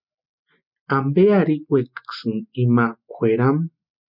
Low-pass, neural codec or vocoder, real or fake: 5.4 kHz; none; real